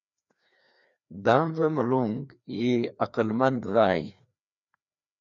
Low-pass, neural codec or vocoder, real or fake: 7.2 kHz; codec, 16 kHz, 2 kbps, FreqCodec, larger model; fake